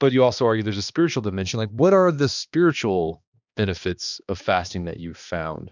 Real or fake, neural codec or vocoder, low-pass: fake; codec, 16 kHz, 2 kbps, X-Codec, HuBERT features, trained on balanced general audio; 7.2 kHz